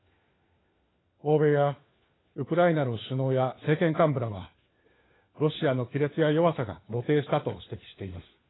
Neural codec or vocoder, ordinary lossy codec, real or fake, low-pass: codec, 16 kHz, 4 kbps, FunCodec, trained on LibriTTS, 50 frames a second; AAC, 16 kbps; fake; 7.2 kHz